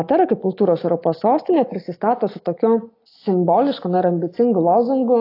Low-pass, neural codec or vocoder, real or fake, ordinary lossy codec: 5.4 kHz; none; real; AAC, 32 kbps